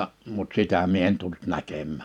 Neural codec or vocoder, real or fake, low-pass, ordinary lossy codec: vocoder, 44.1 kHz, 128 mel bands every 512 samples, BigVGAN v2; fake; 19.8 kHz; none